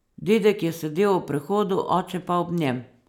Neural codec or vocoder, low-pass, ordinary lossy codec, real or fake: none; 19.8 kHz; none; real